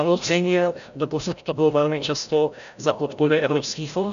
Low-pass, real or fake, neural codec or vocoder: 7.2 kHz; fake; codec, 16 kHz, 0.5 kbps, FreqCodec, larger model